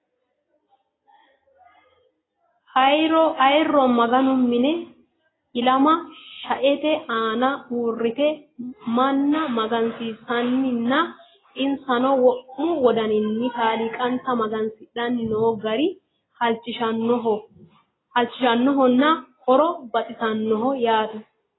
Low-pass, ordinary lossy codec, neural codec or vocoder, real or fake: 7.2 kHz; AAC, 16 kbps; none; real